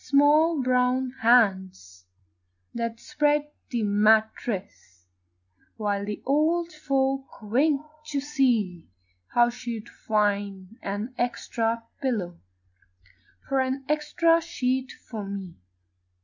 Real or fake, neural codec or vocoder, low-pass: real; none; 7.2 kHz